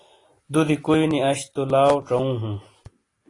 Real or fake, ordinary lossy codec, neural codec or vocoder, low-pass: real; AAC, 32 kbps; none; 10.8 kHz